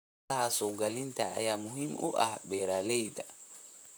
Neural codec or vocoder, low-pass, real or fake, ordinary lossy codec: vocoder, 44.1 kHz, 128 mel bands, Pupu-Vocoder; none; fake; none